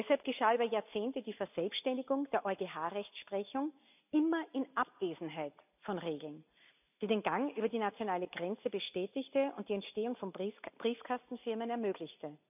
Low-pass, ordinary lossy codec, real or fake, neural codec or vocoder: 3.6 kHz; none; real; none